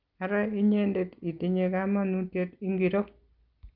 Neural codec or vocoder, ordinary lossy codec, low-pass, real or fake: none; Opus, 24 kbps; 5.4 kHz; real